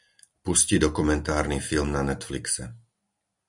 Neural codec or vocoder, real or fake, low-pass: none; real; 10.8 kHz